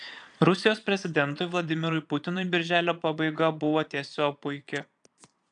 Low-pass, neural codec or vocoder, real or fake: 9.9 kHz; vocoder, 22.05 kHz, 80 mel bands, Vocos; fake